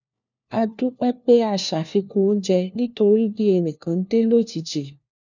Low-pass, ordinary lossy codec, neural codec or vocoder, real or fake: 7.2 kHz; none; codec, 16 kHz, 1 kbps, FunCodec, trained on LibriTTS, 50 frames a second; fake